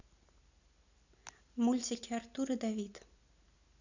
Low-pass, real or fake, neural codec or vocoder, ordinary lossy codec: 7.2 kHz; real; none; none